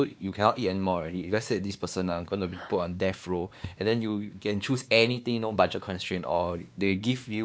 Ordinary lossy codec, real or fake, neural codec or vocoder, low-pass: none; fake; codec, 16 kHz, 2 kbps, X-Codec, WavLM features, trained on Multilingual LibriSpeech; none